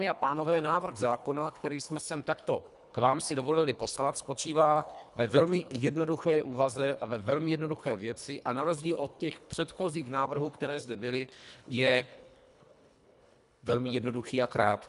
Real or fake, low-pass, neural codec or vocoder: fake; 10.8 kHz; codec, 24 kHz, 1.5 kbps, HILCodec